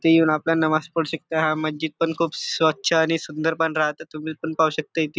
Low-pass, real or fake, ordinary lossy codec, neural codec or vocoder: none; real; none; none